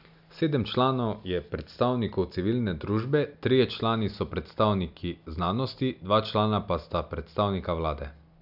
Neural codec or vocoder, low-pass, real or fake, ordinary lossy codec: none; 5.4 kHz; real; none